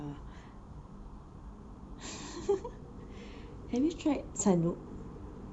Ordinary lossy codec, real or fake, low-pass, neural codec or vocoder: none; fake; 9.9 kHz; vocoder, 44.1 kHz, 128 mel bands every 512 samples, BigVGAN v2